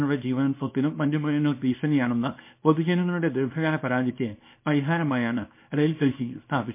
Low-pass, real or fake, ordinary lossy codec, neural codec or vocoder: 3.6 kHz; fake; MP3, 24 kbps; codec, 24 kHz, 0.9 kbps, WavTokenizer, small release